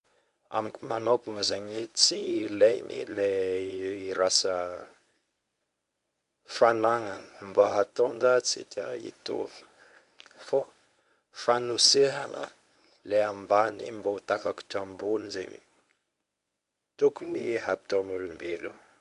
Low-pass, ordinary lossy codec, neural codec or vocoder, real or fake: 10.8 kHz; none; codec, 24 kHz, 0.9 kbps, WavTokenizer, medium speech release version 1; fake